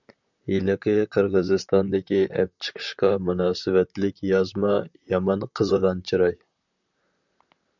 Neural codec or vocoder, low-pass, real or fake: vocoder, 44.1 kHz, 128 mel bands, Pupu-Vocoder; 7.2 kHz; fake